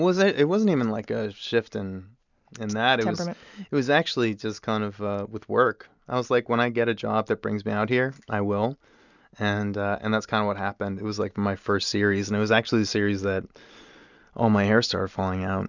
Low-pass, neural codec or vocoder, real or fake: 7.2 kHz; none; real